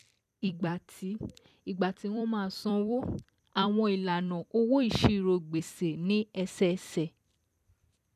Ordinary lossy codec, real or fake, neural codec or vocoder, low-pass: none; fake; vocoder, 44.1 kHz, 128 mel bands every 256 samples, BigVGAN v2; 14.4 kHz